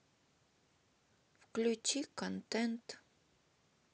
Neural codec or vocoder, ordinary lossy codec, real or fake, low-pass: none; none; real; none